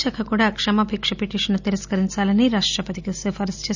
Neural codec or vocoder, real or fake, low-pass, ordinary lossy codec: none; real; 7.2 kHz; none